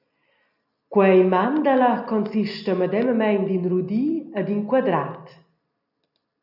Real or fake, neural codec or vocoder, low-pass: real; none; 5.4 kHz